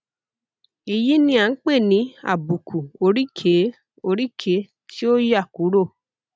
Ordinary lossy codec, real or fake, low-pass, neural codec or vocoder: none; real; none; none